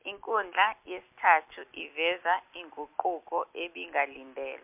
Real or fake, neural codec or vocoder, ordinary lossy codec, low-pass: real; none; MP3, 32 kbps; 3.6 kHz